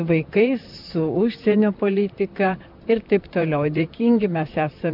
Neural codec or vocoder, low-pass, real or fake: vocoder, 44.1 kHz, 128 mel bands, Pupu-Vocoder; 5.4 kHz; fake